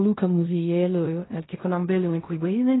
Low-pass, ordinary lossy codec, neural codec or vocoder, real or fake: 7.2 kHz; AAC, 16 kbps; codec, 16 kHz in and 24 kHz out, 0.4 kbps, LongCat-Audio-Codec, fine tuned four codebook decoder; fake